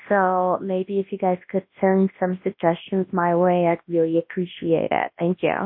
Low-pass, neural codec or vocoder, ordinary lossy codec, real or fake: 5.4 kHz; codec, 24 kHz, 0.9 kbps, WavTokenizer, large speech release; MP3, 32 kbps; fake